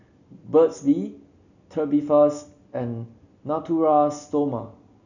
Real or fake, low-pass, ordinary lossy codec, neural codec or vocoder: real; 7.2 kHz; none; none